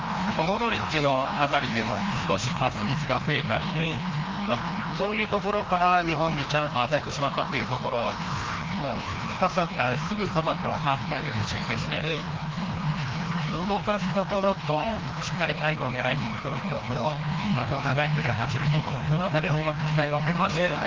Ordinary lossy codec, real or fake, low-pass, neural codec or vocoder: Opus, 32 kbps; fake; 7.2 kHz; codec, 16 kHz, 1 kbps, FreqCodec, larger model